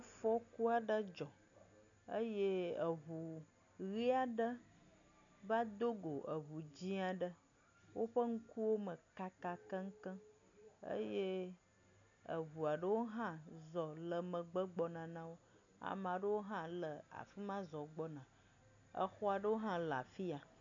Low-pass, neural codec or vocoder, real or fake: 7.2 kHz; none; real